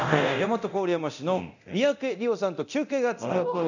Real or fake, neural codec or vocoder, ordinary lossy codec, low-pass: fake; codec, 24 kHz, 0.9 kbps, DualCodec; none; 7.2 kHz